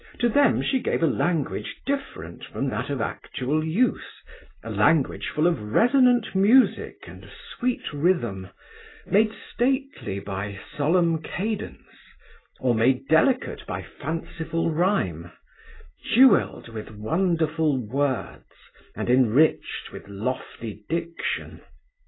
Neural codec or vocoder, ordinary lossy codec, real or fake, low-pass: none; AAC, 16 kbps; real; 7.2 kHz